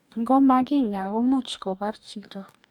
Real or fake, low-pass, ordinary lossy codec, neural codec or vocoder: fake; 19.8 kHz; none; codec, 44.1 kHz, 2.6 kbps, DAC